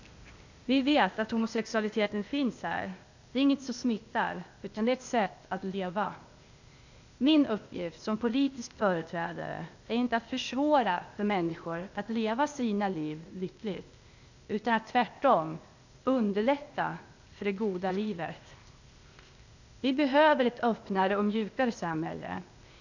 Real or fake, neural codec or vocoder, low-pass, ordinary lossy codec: fake; codec, 16 kHz, 0.8 kbps, ZipCodec; 7.2 kHz; none